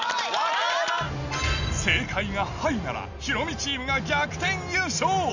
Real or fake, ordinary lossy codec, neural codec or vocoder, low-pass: real; none; none; 7.2 kHz